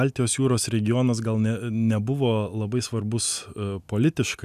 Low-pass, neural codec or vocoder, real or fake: 14.4 kHz; none; real